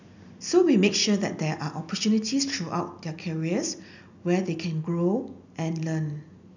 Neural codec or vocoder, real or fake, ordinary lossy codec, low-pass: none; real; none; 7.2 kHz